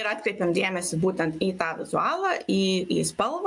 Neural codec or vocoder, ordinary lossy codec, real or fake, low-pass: none; MP3, 64 kbps; real; 10.8 kHz